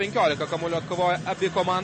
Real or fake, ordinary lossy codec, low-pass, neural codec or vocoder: real; MP3, 32 kbps; 10.8 kHz; none